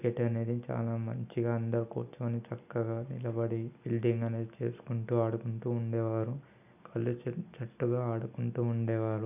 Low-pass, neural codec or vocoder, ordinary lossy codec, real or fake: 3.6 kHz; none; none; real